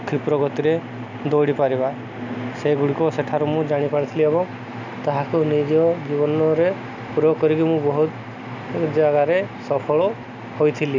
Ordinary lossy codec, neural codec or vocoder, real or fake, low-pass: none; none; real; 7.2 kHz